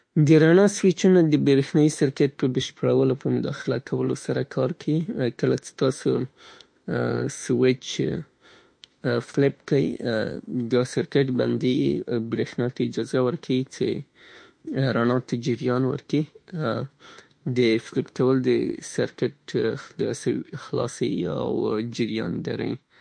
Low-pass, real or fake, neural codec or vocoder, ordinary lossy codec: 9.9 kHz; fake; autoencoder, 48 kHz, 32 numbers a frame, DAC-VAE, trained on Japanese speech; MP3, 48 kbps